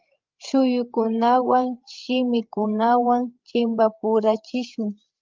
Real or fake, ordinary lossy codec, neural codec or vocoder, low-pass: fake; Opus, 32 kbps; codec, 16 kHz, 8 kbps, FreqCodec, larger model; 7.2 kHz